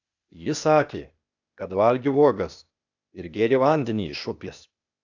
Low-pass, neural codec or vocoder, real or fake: 7.2 kHz; codec, 16 kHz, 0.8 kbps, ZipCodec; fake